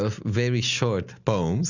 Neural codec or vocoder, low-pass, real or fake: none; 7.2 kHz; real